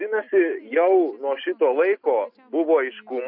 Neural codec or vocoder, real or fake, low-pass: none; real; 5.4 kHz